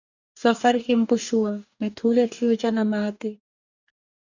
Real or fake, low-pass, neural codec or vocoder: fake; 7.2 kHz; codec, 44.1 kHz, 2.6 kbps, DAC